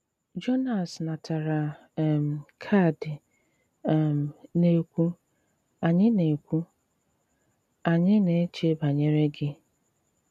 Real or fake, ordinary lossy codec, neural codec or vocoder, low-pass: real; none; none; 14.4 kHz